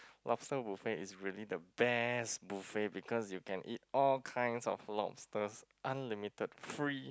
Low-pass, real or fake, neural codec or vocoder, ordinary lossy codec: none; real; none; none